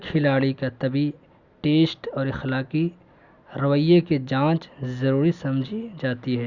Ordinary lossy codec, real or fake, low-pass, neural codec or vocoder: Opus, 64 kbps; real; 7.2 kHz; none